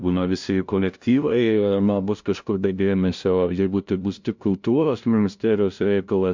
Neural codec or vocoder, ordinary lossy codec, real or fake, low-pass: codec, 16 kHz, 0.5 kbps, FunCodec, trained on LibriTTS, 25 frames a second; MP3, 64 kbps; fake; 7.2 kHz